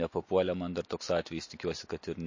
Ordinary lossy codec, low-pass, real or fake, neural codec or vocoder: MP3, 32 kbps; 7.2 kHz; real; none